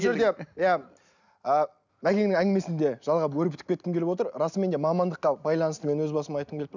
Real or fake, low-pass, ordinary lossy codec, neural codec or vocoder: real; 7.2 kHz; none; none